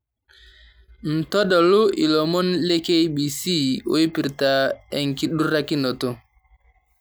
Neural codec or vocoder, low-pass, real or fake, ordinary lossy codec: none; none; real; none